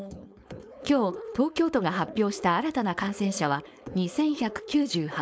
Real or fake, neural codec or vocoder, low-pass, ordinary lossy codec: fake; codec, 16 kHz, 4.8 kbps, FACodec; none; none